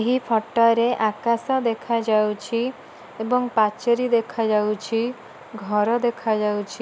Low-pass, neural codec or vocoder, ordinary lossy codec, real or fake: none; none; none; real